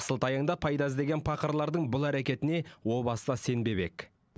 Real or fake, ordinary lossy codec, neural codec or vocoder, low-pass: real; none; none; none